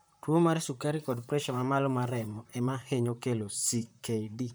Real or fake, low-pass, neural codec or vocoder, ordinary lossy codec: fake; none; vocoder, 44.1 kHz, 128 mel bands, Pupu-Vocoder; none